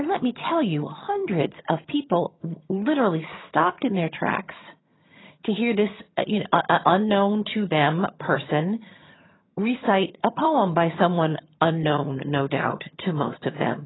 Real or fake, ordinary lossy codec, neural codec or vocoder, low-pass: fake; AAC, 16 kbps; vocoder, 22.05 kHz, 80 mel bands, HiFi-GAN; 7.2 kHz